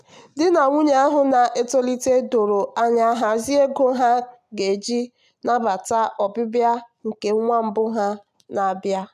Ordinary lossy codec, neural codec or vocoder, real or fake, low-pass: none; none; real; 14.4 kHz